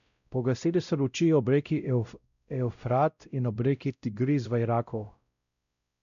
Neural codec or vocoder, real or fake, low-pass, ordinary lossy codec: codec, 16 kHz, 0.5 kbps, X-Codec, WavLM features, trained on Multilingual LibriSpeech; fake; 7.2 kHz; none